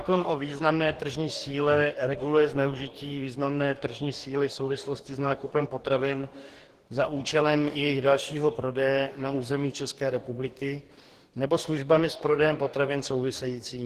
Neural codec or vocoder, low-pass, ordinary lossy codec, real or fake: codec, 44.1 kHz, 2.6 kbps, DAC; 14.4 kHz; Opus, 16 kbps; fake